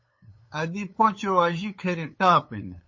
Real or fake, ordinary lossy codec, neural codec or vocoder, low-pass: fake; MP3, 32 kbps; codec, 16 kHz, 8 kbps, FunCodec, trained on LibriTTS, 25 frames a second; 7.2 kHz